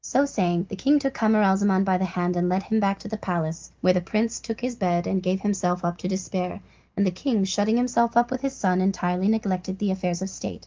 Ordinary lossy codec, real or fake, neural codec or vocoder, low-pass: Opus, 32 kbps; real; none; 7.2 kHz